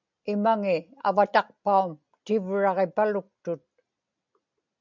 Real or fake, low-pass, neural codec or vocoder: real; 7.2 kHz; none